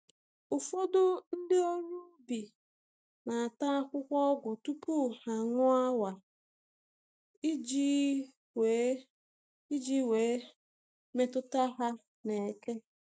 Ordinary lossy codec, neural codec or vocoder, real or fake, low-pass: none; none; real; none